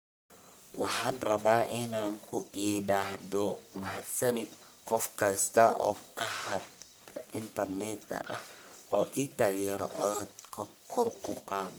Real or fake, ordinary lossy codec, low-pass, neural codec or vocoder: fake; none; none; codec, 44.1 kHz, 1.7 kbps, Pupu-Codec